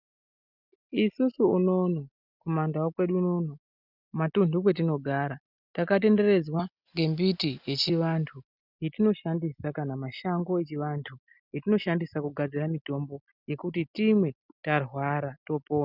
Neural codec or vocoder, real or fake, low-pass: none; real; 5.4 kHz